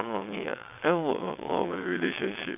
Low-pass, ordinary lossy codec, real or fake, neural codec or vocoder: 3.6 kHz; none; fake; vocoder, 22.05 kHz, 80 mel bands, WaveNeXt